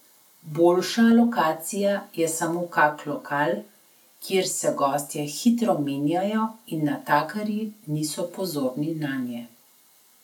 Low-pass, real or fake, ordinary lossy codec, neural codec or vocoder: 19.8 kHz; real; none; none